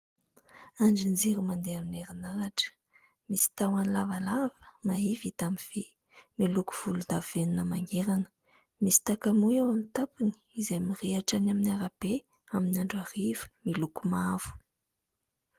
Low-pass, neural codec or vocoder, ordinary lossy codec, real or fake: 14.4 kHz; none; Opus, 24 kbps; real